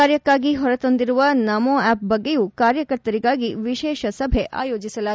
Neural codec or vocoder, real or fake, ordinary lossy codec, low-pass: none; real; none; none